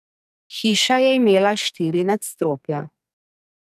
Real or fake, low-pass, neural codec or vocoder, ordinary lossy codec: fake; 14.4 kHz; codec, 44.1 kHz, 2.6 kbps, SNAC; none